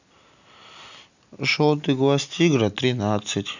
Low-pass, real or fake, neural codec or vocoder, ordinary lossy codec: 7.2 kHz; real; none; none